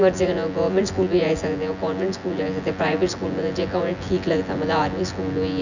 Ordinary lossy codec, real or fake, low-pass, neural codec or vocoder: none; fake; 7.2 kHz; vocoder, 24 kHz, 100 mel bands, Vocos